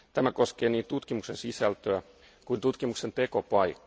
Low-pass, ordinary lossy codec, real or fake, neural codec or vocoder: none; none; real; none